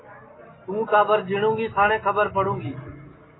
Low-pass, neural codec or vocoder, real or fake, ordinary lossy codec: 7.2 kHz; none; real; AAC, 16 kbps